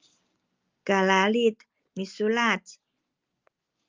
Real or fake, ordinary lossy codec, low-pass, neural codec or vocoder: real; Opus, 24 kbps; 7.2 kHz; none